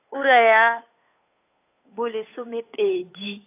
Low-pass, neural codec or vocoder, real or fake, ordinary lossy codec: 3.6 kHz; codec, 16 kHz, 8 kbps, FunCodec, trained on Chinese and English, 25 frames a second; fake; AAC, 24 kbps